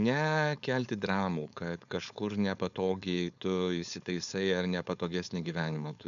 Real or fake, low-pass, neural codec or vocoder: fake; 7.2 kHz; codec, 16 kHz, 4.8 kbps, FACodec